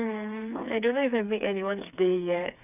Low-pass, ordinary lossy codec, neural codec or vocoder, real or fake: 3.6 kHz; none; codec, 16 kHz, 4 kbps, FreqCodec, smaller model; fake